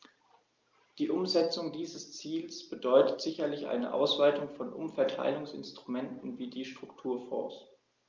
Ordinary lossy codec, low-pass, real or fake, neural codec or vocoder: Opus, 24 kbps; 7.2 kHz; real; none